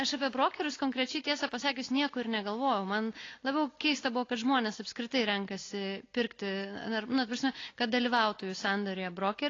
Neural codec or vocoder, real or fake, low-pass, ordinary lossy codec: none; real; 7.2 kHz; AAC, 32 kbps